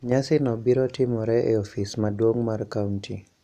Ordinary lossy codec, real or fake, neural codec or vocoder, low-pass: none; real; none; 14.4 kHz